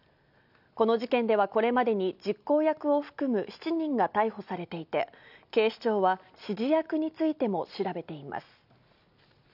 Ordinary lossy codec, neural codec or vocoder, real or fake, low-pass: none; none; real; 5.4 kHz